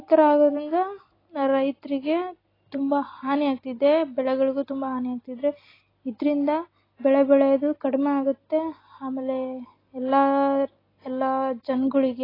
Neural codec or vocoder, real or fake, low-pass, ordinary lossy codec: none; real; 5.4 kHz; AAC, 24 kbps